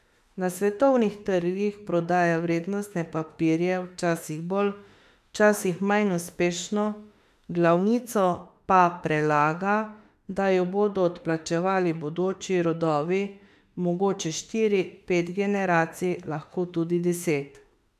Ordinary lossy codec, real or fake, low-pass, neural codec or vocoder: AAC, 96 kbps; fake; 14.4 kHz; autoencoder, 48 kHz, 32 numbers a frame, DAC-VAE, trained on Japanese speech